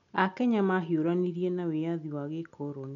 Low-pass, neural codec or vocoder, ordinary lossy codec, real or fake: 7.2 kHz; none; none; real